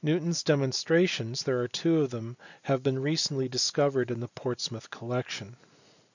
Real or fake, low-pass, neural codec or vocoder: real; 7.2 kHz; none